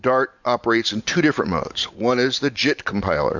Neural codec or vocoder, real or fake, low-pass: vocoder, 44.1 kHz, 128 mel bands every 512 samples, BigVGAN v2; fake; 7.2 kHz